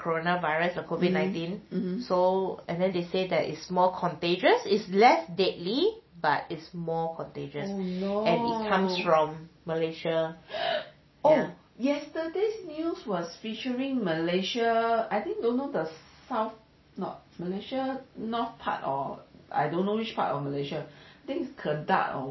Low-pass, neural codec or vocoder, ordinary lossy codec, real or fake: 7.2 kHz; none; MP3, 24 kbps; real